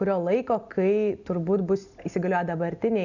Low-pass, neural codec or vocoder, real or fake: 7.2 kHz; none; real